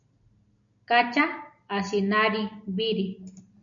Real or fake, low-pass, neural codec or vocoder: real; 7.2 kHz; none